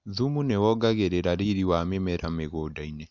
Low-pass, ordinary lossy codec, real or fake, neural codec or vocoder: 7.2 kHz; none; real; none